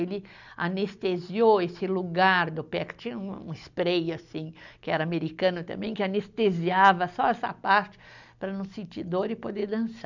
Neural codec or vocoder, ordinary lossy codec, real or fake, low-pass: none; none; real; 7.2 kHz